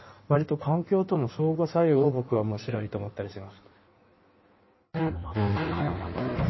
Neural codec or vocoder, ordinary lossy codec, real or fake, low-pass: codec, 16 kHz in and 24 kHz out, 1.1 kbps, FireRedTTS-2 codec; MP3, 24 kbps; fake; 7.2 kHz